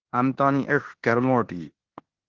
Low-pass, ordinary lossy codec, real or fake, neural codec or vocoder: 7.2 kHz; Opus, 16 kbps; fake; codec, 16 kHz in and 24 kHz out, 0.9 kbps, LongCat-Audio-Codec, four codebook decoder